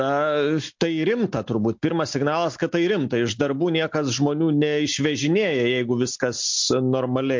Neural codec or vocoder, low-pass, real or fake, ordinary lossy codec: none; 7.2 kHz; real; MP3, 48 kbps